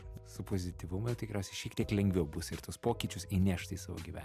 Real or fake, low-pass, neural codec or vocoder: real; 14.4 kHz; none